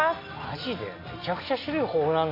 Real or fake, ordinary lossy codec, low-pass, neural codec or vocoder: real; AAC, 32 kbps; 5.4 kHz; none